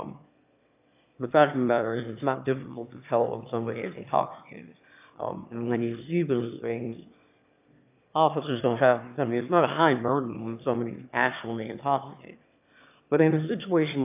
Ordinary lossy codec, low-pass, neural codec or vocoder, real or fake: AAC, 32 kbps; 3.6 kHz; autoencoder, 22.05 kHz, a latent of 192 numbers a frame, VITS, trained on one speaker; fake